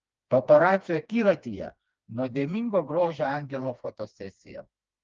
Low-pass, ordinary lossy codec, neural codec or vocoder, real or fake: 7.2 kHz; Opus, 24 kbps; codec, 16 kHz, 2 kbps, FreqCodec, smaller model; fake